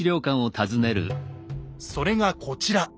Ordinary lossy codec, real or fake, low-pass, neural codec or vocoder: none; real; none; none